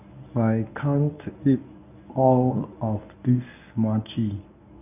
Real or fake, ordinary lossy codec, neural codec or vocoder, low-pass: fake; none; codec, 24 kHz, 0.9 kbps, WavTokenizer, medium speech release version 1; 3.6 kHz